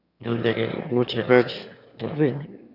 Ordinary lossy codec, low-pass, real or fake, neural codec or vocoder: none; 5.4 kHz; fake; autoencoder, 22.05 kHz, a latent of 192 numbers a frame, VITS, trained on one speaker